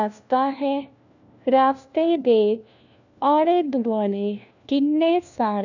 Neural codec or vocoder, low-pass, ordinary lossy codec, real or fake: codec, 16 kHz, 1 kbps, FunCodec, trained on LibriTTS, 50 frames a second; 7.2 kHz; none; fake